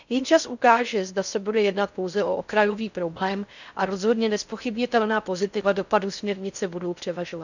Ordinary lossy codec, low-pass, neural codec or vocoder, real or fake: none; 7.2 kHz; codec, 16 kHz in and 24 kHz out, 0.6 kbps, FocalCodec, streaming, 4096 codes; fake